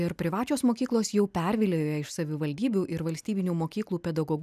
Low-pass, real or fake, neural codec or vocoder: 14.4 kHz; real; none